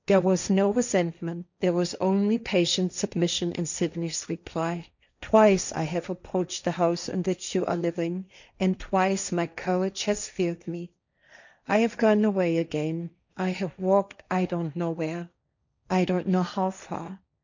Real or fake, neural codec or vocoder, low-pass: fake; codec, 16 kHz, 1.1 kbps, Voila-Tokenizer; 7.2 kHz